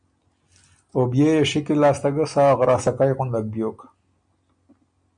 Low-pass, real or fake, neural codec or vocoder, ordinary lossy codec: 9.9 kHz; real; none; MP3, 64 kbps